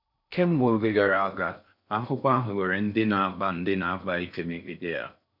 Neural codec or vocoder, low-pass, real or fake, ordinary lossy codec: codec, 16 kHz in and 24 kHz out, 0.6 kbps, FocalCodec, streaming, 4096 codes; 5.4 kHz; fake; none